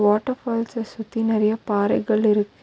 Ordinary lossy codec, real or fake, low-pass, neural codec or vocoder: none; real; none; none